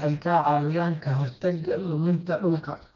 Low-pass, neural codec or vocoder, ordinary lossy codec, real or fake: 7.2 kHz; codec, 16 kHz, 1 kbps, FreqCodec, smaller model; none; fake